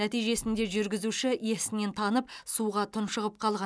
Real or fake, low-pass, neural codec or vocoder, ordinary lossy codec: real; none; none; none